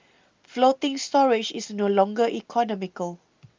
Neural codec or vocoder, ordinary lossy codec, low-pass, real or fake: none; Opus, 24 kbps; 7.2 kHz; real